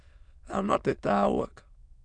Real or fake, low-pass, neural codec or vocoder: fake; 9.9 kHz; autoencoder, 22.05 kHz, a latent of 192 numbers a frame, VITS, trained on many speakers